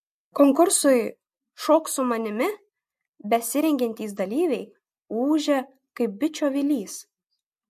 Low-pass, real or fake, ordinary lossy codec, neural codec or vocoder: 14.4 kHz; real; MP3, 64 kbps; none